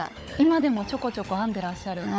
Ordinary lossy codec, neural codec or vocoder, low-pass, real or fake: none; codec, 16 kHz, 16 kbps, FunCodec, trained on LibriTTS, 50 frames a second; none; fake